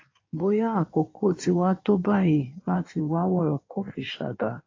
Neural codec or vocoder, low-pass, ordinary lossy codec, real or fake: codec, 16 kHz in and 24 kHz out, 1.1 kbps, FireRedTTS-2 codec; 7.2 kHz; AAC, 32 kbps; fake